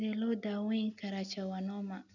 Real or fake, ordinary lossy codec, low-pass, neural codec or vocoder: real; none; 7.2 kHz; none